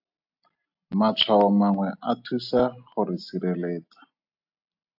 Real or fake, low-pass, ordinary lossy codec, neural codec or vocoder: real; 5.4 kHz; AAC, 48 kbps; none